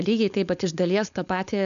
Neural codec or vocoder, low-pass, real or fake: codec, 16 kHz, 4.8 kbps, FACodec; 7.2 kHz; fake